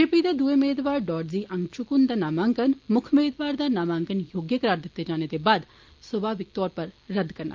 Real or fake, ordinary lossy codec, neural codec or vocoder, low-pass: real; Opus, 32 kbps; none; 7.2 kHz